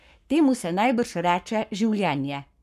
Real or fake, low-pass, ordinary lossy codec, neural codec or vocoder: fake; 14.4 kHz; none; codec, 44.1 kHz, 7.8 kbps, Pupu-Codec